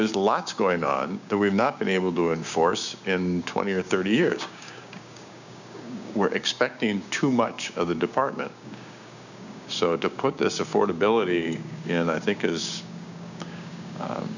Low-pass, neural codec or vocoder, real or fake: 7.2 kHz; codec, 16 kHz, 6 kbps, DAC; fake